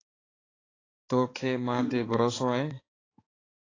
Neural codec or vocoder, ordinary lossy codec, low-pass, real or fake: codec, 16 kHz, 4 kbps, X-Codec, HuBERT features, trained on balanced general audio; AAC, 32 kbps; 7.2 kHz; fake